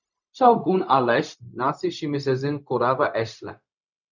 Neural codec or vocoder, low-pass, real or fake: codec, 16 kHz, 0.4 kbps, LongCat-Audio-Codec; 7.2 kHz; fake